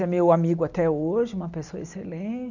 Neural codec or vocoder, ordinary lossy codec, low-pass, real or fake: none; none; 7.2 kHz; real